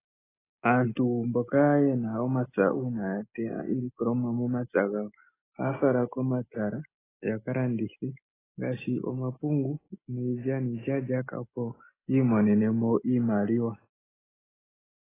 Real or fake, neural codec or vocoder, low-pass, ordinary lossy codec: real; none; 3.6 kHz; AAC, 16 kbps